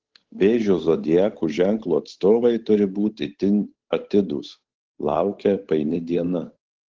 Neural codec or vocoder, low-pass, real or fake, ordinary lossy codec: codec, 16 kHz, 8 kbps, FunCodec, trained on Chinese and English, 25 frames a second; 7.2 kHz; fake; Opus, 16 kbps